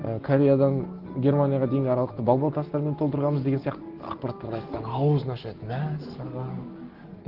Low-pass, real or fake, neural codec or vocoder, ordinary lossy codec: 5.4 kHz; real; none; Opus, 16 kbps